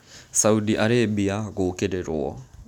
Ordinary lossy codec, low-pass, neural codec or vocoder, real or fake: none; 19.8 kHz; none; real